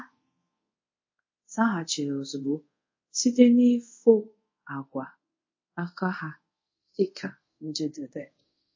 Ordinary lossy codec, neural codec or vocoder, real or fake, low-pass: MP3, 32 kbps; codec, 24 kHz, 0.5 kbps, DualCodec; fake; 7.2 kHz